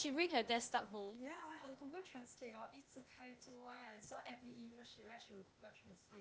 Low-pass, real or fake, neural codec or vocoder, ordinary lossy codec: none; fake; codec, 16 kHz, 0.8 kbps, ZipCodec; none